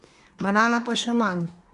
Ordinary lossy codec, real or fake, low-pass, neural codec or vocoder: none; fake; 10.8 kHz; codec, 24 kHz, 1 kbps, SNAC